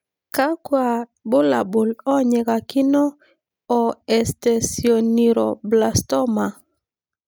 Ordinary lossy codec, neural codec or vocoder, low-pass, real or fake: none; none; none; real